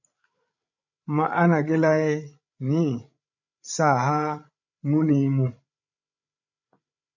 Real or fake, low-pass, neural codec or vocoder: fake; 7.2 kHz; codec, 16 kHz, 8 kbps, FreqCodec, larger model